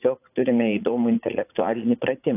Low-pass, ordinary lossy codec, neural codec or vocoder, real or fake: 3.6 kHz; AAC, 24 kbps; vocoder, 44.1 kHz, 80 mel bands, Vocos; fake